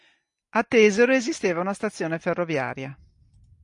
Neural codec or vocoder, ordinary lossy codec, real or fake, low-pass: none; MP3, 48 kbps; real; 10.8 kHz